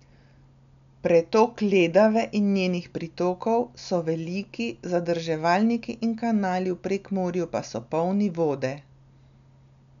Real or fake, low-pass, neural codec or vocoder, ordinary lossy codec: real; 7.2 kHz; none; none